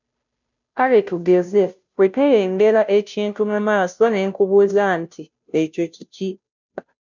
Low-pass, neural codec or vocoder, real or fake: 7.2 kHz; codec, 16 kHz, 0.5 kbps, FunCodec, trained on Chinese and English, 25 frames a second; fake